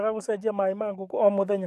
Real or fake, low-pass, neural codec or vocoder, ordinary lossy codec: fake; 14.4 kHz; codec, 44.1 kHz, 7.8 kbps, DAC; none